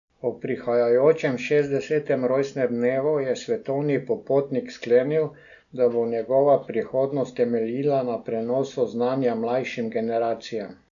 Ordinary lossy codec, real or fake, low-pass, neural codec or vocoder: none; real; 7.2 kHz; none